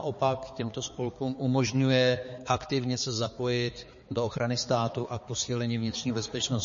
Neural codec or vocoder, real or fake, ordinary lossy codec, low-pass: codec, 16 kHz, 4 kbps, X-Codec, HuBERT features, trained on balanced general audio; fake; MP3, 32 kbps; 7.2 kHz